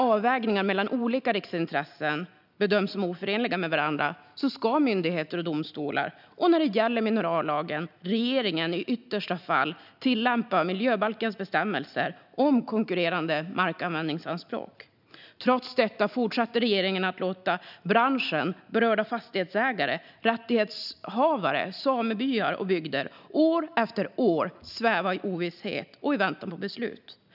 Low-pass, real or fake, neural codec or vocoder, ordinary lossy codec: 5.4 kHz; real; none; none